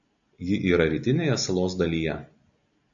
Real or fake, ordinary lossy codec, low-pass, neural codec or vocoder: real; AAC, 64 kbps; 7.2 kHz; none